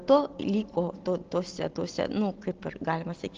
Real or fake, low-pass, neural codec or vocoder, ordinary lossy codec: real; 7.2 kHz; none; Opus, 16 kbps